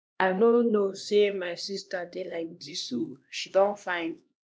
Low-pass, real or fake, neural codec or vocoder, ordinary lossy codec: none; fake; codec, 16 kHz, 1 kbps, X-Codec, HuBERT features, trained on LibriSpeech; none